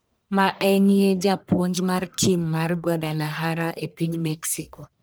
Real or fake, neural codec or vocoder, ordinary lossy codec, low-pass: fake; codec, 44.1 kHz, 1.7 kbps, Pupu-Codec; none; none